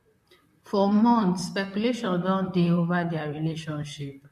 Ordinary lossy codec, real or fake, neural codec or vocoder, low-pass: MP3, 64 kbps; fake; vocoder, 44.1 kHz, 128 mel bands, Pupu-Vocoder; 14.4 kHz